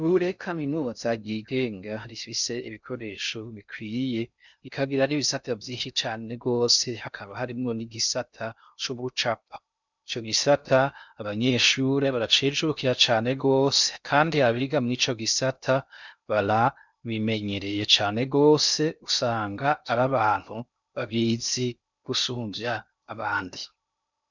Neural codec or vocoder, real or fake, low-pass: codec, 16 kHz in and 24 kHz out, 0.6 kbps, FocalCodec, streaming, 2048 codes; fake; 7.2 kHz